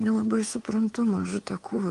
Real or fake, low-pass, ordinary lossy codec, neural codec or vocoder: fake; 10.8 kHz; Opus, 24 kbps; codec, 24 kHz, 3.1 kbps, DualCodec